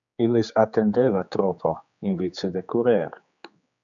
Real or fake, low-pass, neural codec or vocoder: fake; 7.2 kHz; codec, 16 kHz, 4 kbps, X-Codec, HuBERT features, trained on general audio